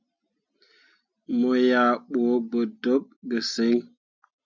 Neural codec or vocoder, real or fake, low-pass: none; real; 7.2 kHz